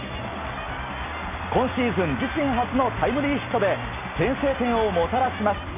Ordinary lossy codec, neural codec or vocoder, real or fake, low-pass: MP3, 24 kbps; none; real; 3.6 kHz